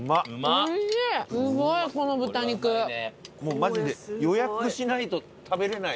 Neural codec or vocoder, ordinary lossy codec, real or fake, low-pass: none; none; real; none